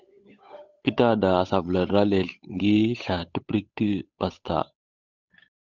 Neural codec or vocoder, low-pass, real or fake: codec, 16 kHz, 8 kbps, FunCodec, trained on Chinese and English, 25 frames a second; 7.2 kHz; fake